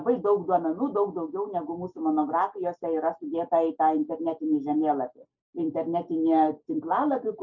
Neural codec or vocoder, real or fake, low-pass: none; real; 7.2 kHz